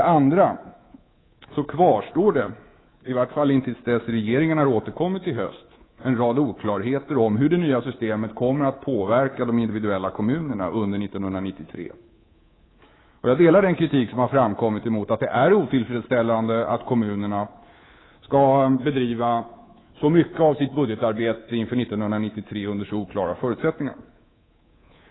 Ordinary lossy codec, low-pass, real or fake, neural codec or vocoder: AAC, 16 kbps; 7.2 kHz; fake; codec, 24 kHz, 3.1 kbps, DualCodec